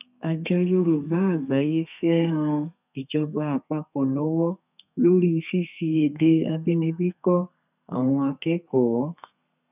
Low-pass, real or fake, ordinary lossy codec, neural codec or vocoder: 3.6 kHz; fake; none; codec, 32 kHz, 1.9 kbps, SNAC